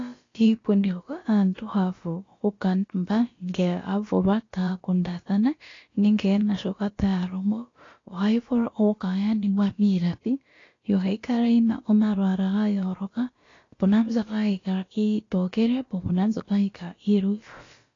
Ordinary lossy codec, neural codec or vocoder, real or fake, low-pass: AAC, 32 kbps; codec, 16 kHz, about 1 kbps, DyCAST, with the encoder's durations; fake; 7.2 kHz